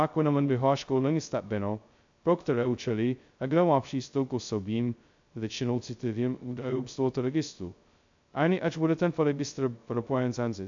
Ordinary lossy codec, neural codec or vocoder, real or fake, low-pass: AAC, 64 kbps; codec, 16 kHz, 0.2 kbps, FocalCodec; fake; 7.2 kHz